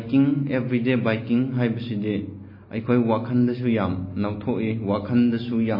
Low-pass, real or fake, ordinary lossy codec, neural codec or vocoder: 5.4 kHz; real; MP3, 24 kbps; none